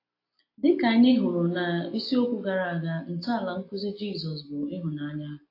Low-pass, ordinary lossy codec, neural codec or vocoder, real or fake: 5.4 kHz; AAC, 32 kbps; none; real